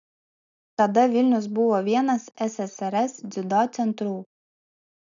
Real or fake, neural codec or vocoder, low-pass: real; none; 7.2 kHz